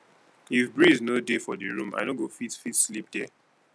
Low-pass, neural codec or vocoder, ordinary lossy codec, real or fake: none; none; none; real